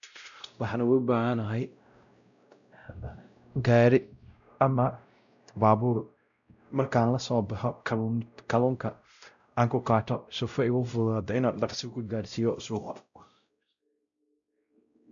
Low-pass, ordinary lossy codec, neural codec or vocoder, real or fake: 7.2 kHz; none; codec, 16 kHz, 0.5 kbps, X-Codec, WavLM features, trained on Multilingual LibriSpeech; fake